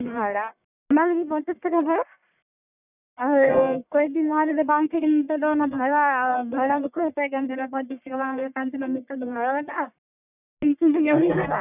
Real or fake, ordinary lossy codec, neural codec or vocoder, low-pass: fake; none; codec, 44.1 kHz, 1.7 kbps, Pupu-Codec; 3.6 kHz